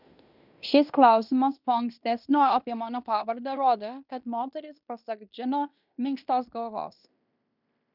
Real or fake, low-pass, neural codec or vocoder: fake; 5.4 kHz; codec, 16 kHz in and 24 kHz out, 0.9 kbps, LongCat-Audio-Codec, fine tuned four codebook decoder